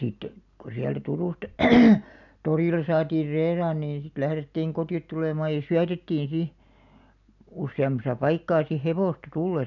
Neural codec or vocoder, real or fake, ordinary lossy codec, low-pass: none; real; none; 7.2 kHz